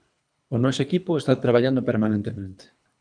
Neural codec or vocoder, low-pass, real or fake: codec, 24 kHz, 3 kbps, HILCodec; 9.9 kHz; fake